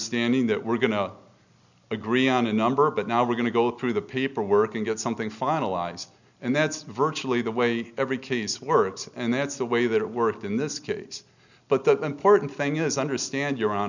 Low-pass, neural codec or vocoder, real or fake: 7.2 kHz; none; real